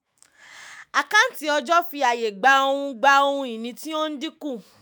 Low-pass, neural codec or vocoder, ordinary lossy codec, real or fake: none; autoencoder, 48 kHz, 128 numbers a frame, DAC-VAE, trained on Japanese speech; none; fake